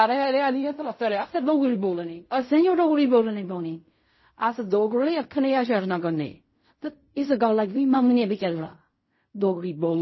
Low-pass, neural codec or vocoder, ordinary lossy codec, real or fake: 7.2 kHz; codec, 16 kHz in and 24 kHz out, 0.4 kbps, LongCat-Audio-Codec, fine tuned four codebook decoder; MP3, 24 kbps; fake